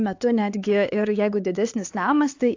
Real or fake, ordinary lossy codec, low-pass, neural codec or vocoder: fake; AAC, 48 kbps; 7.2 kHz; codec, 16 kHz, 4 kbps, X-Codec, HuBERT features, trained on LibriSpeech